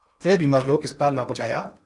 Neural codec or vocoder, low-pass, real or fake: codec, 16 kHz in and 24 kHz out, 0.8 kbps, FocalCodec, streaming, 65536 codes; 10.8 kHz; fake